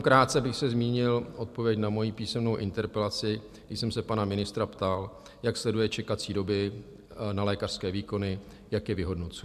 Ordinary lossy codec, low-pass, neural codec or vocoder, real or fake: AAC, 96 kbps; 14.4 kHz; none; real